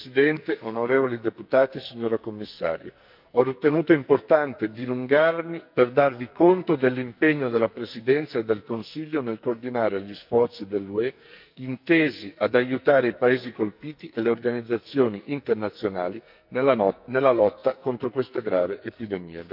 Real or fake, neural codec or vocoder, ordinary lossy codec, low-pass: fake; codec, 44.1 kHz, 2.6 kbps, SNAC; none; 5.4 kHz